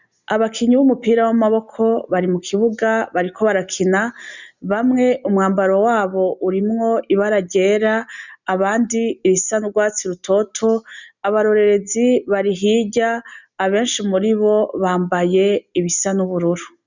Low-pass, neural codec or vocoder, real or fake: 7.2 kHz; none; real